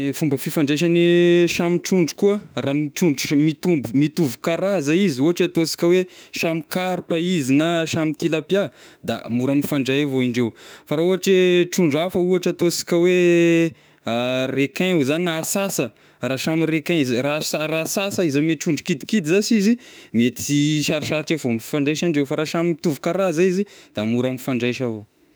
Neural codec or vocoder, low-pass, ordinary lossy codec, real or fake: autoencoder, 48 kHz, 32 numbers a frame, DAC-VAE, trained on Japanese speech; none; none; fake